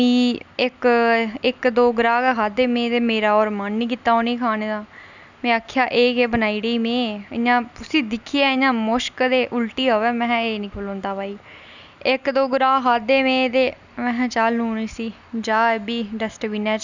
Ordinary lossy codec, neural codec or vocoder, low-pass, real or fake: none; none; 7.2 kHz; real